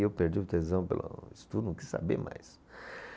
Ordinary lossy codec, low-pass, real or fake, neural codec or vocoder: none; none; real; none